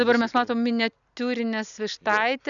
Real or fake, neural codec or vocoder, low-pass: real; none; 7.2 kHz